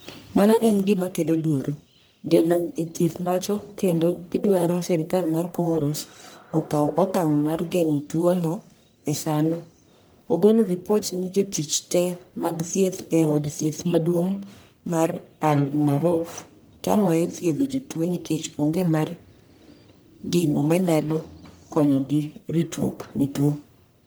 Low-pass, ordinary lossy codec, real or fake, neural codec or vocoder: none; none; fake; codec, 44.1 kHz, 1.7 kbps, Pupu-Codec